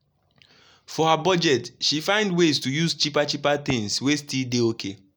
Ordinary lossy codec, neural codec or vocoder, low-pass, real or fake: none; none; none; real